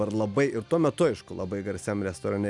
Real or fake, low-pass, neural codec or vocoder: real; 10.8 kHz; none